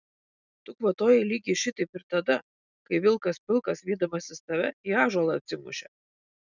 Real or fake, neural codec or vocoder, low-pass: real; none; 7.2 kHz